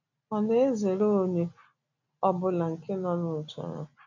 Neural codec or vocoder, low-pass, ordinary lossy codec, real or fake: none; 7.2 kHz; none; real